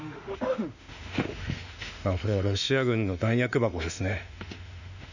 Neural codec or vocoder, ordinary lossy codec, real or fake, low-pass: autoencoder, 48 kHz, 32 numbers a frame, DAC-VAE, trained on Japanese speech; none; fake; 7.2 kHz